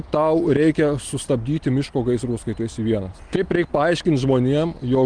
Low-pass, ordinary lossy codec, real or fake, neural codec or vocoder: 9.9 kHz; Opus, 24 kbps; real; none